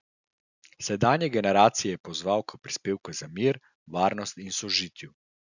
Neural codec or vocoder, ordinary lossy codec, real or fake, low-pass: none; none; real; 7.2 kHz